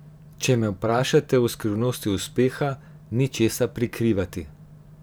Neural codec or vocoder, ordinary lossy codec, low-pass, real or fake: vocoder, 44.1 kHz, 128 mel bands every 512 samples, BigVGAN v2; none; none; fake